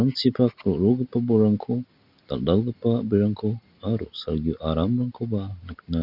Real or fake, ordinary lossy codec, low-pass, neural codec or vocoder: real; none; 5.4 kHz; none